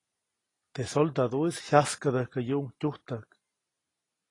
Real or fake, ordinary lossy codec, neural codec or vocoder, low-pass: real; AAC, 32 kbps; none; 10.8 kHz